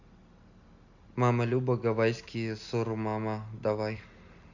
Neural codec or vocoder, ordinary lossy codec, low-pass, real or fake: none; none; 7.2 kHz; real